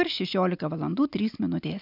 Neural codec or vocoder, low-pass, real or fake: none; 5.4 kHz; real